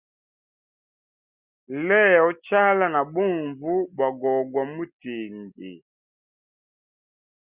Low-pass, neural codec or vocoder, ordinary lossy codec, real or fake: 3.6 kHz; none; Opus, 64 kbps; real